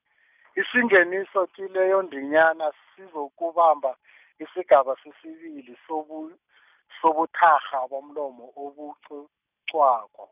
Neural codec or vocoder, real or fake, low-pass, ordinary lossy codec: none; real; 3.6 kHz; none